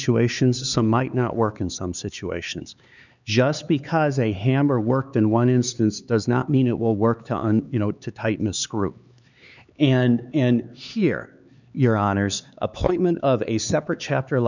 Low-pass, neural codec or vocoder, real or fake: 7.2 kHz; codec, 16 kHz, 4 kbps, X-Codec, HuBERT features, trained on LibriSpeech; fake